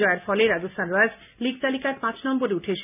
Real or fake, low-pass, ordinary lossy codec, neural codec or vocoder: real; 3.6 kHz; none; none